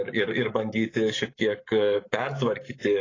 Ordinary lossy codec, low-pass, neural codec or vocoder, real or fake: AAC, 32 kbps; 7.2 kHz; codec, 44.1 kHz, 7.8 kbps, Pupu-Codec; fake